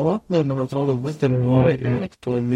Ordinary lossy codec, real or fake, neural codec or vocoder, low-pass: MP3, 64 kbps; fake; codec, 44.1 kHz, 0.9 kbps, DAC; 19.8 kHz